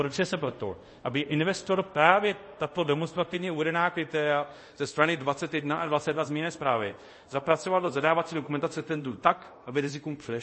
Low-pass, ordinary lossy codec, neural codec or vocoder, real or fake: 10.8 kHz; MP3, 32 kbps; codec, 24 kHz, 0.5 kbps, DualCodec; fake